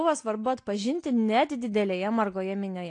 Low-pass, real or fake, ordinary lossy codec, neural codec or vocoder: 9.9 kHz; real; AAC, 48 kbps; none